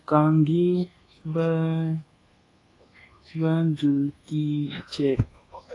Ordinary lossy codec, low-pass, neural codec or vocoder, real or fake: AAC, 32 kbps; 10.8 kHz; codec, 24 kHz, 1.2 kbps, DualCodec; fake